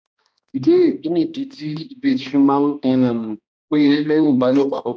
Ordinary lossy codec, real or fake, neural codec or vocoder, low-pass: none; fake; codec, 16 kHz, 1 kbps, X-Codec, HuBERT features, trained on balanced general audio; none